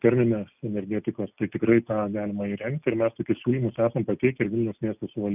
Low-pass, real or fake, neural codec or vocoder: 3.6 kHz; real; none